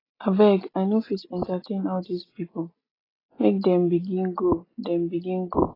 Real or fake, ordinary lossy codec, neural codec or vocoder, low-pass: real; AAC, 24 kbps; none; 5.4 kHz